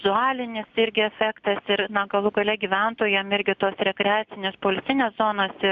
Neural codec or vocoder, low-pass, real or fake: none; 7.2 kHz; real